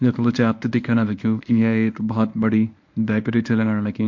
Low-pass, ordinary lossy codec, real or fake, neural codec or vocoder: 7.2 kHz; MP3, 48 kbps; fake; codec, 24 kHz, 0.9 kbps, WavTokenizer, medium speech release version 1